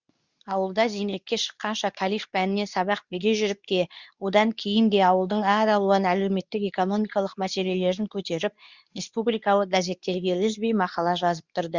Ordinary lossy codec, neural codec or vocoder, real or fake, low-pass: Opus, 64 kbps; codec, 24 kHz, 0.9 kbps, WavTokenizer, medium speech release version 2; fake; 7.2 kHz